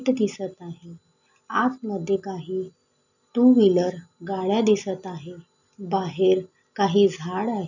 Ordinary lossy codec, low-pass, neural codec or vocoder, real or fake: none; 7.2 kHz; none; real